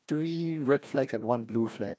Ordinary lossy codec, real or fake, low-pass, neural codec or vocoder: none; fake; none; codec, 16 kHz, 1 kbps, FreqCodec, larger model